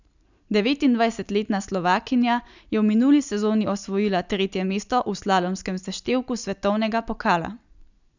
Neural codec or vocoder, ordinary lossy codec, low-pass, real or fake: none; none; 7.2 kHz; real